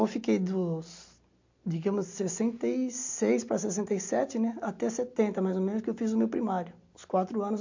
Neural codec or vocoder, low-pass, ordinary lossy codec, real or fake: none; 7.2 kHz; MP3, 48 kbps; real